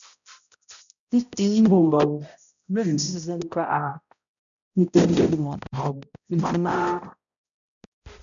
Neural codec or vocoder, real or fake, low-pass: codec, 16 kHz, 0.5 kbps, X-Codec, HuBERT features, trained on balanced general audio; fake; 7.2 kHz